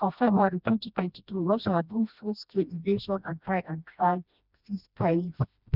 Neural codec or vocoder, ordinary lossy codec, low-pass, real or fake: codec, 16 kHz, 1 kbps, FreqCodec, smaller model; none; 5.4 kHz; fake